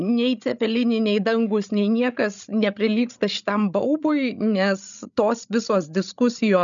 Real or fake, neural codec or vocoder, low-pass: fake; codec, 16 kHz, 16 kbps, FreqCodec, larger model; 7.2 kHz